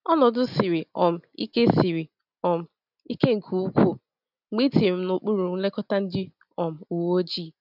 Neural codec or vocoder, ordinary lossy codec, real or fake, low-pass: none; none; real; 5.4 kHz